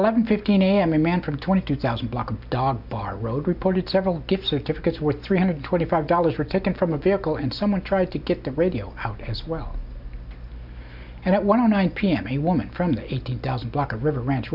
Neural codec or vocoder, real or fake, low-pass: none; real; 5.4 kHz